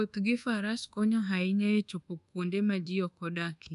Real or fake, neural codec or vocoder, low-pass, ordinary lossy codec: fake; codec, 24 kHz, 1.2 kbps, DualCodec; 10.8 kHz; none